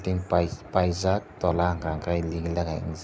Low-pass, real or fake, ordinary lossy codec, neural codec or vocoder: none; real; none; none